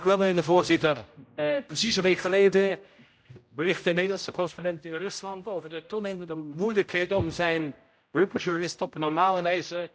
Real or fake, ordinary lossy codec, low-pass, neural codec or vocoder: fake; none; none; codec, 16 kHz, 0.5 kbps, X-Codec, HuBERT features, trained on general audio